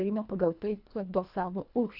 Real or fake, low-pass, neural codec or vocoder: fake; 5.4 kHz; codec, 24 kHz, 1.5 kbps, HILCodec